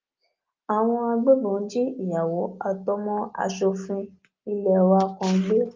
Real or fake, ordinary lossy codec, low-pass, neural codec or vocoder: real; Opus, 24 kbps; 7.2 kHz; none